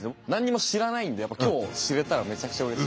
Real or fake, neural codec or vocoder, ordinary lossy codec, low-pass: real; none; none; none